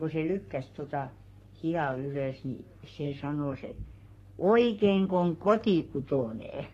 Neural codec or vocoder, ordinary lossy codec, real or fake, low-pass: codec, 44.1 kHz, 3.4 kbps, Pupu-Codec; AAC, 48 kbps; fake; 14.4 kHz